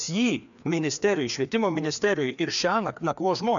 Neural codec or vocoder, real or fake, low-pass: codec, 16 kHz, 2 kbps, FreqCodec, larger model; fake; 7.2 kHz